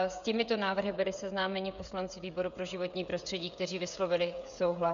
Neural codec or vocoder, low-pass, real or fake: codec, 16 kHz, 16 kbps, FreqCodec, smaller model; 7.2 kHz; fake